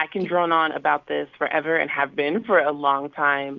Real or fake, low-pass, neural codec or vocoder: real; 7.2 kHz; none